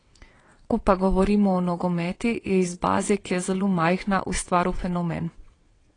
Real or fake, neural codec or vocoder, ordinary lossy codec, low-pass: fake; vocoder, 22.05 kHz, 80 mel bands, WaveNeXt; AAC, 32 kbps; 9.9 kHz